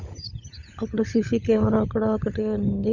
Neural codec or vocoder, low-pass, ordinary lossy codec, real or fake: codec, 16 kHz, 16 kbps, FunCodec, trained on LibriTTS, 50 frames a second; 7.2 kHz; none; fake